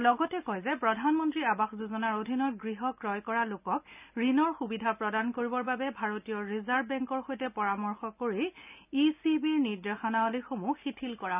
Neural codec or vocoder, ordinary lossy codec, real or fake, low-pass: none; none; real; 3.6 kHz